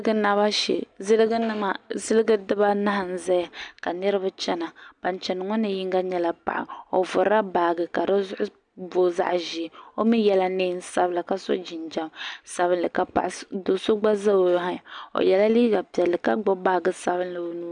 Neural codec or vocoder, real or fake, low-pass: none; real; 9.9 kHz